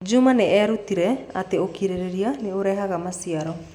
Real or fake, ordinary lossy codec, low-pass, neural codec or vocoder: real; none; 19.8 kHz; none